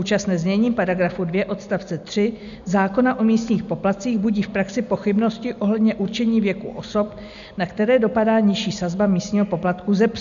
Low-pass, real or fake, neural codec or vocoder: 7.2 kHz; real; none